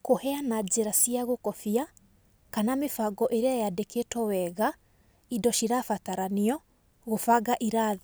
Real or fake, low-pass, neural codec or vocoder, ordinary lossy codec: real; none; none; none